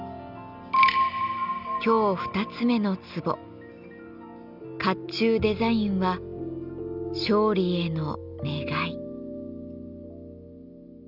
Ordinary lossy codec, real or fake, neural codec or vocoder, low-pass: none; real; none; 5.4 kHz